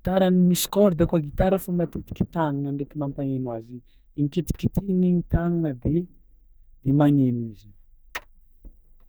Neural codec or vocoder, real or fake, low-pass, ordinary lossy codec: codec, 44.1 kHz, 2.6 kbps, SNAC; fake; none; none